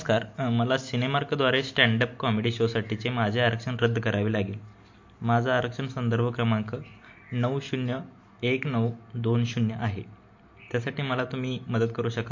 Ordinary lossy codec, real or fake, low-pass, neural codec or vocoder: MP3, 48 kbps; real; 7.2 kHz; none